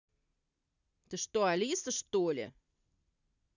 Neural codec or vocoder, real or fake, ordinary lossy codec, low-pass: none; real; none; 7.2 kHz